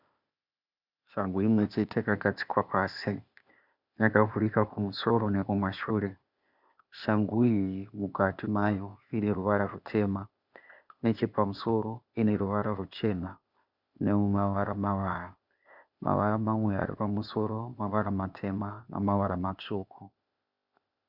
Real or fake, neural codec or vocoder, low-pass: fake; codec, 16 kHz, 0.8 kbps, ZipCodec; 5.4 kHz